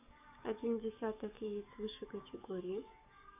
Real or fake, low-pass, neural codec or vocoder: fake; 3.6 kHz; codec, 44.1 kHz, 7.8 kbps, DAC